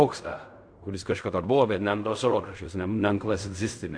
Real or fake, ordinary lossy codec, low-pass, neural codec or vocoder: fake; AAC, 64 kbps; 9.9 kHz; codec, 16 kHz in and 24 kHz out, 0.4 kbps, LongCat-Audio-Codec, fine tuned four codebook decoder